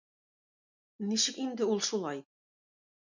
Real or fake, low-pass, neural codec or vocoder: real; 7.2 kHz; none